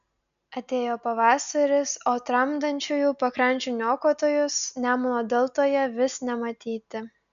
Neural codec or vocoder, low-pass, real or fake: none; 7.2 kHz; real